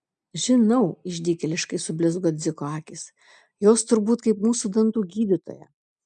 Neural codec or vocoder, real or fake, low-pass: none; real; 9.9 kHz